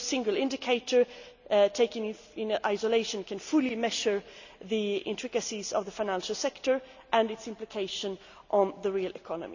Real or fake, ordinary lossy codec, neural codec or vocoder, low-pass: real; none; none; 7.2 kHz